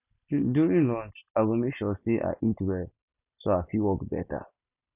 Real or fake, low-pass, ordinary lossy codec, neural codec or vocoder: real; 3.6 kHz; none; none